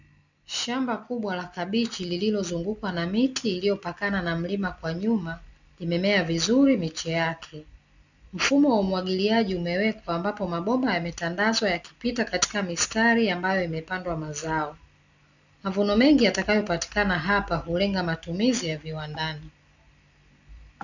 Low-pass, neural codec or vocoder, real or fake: 7.2 kHz; none; real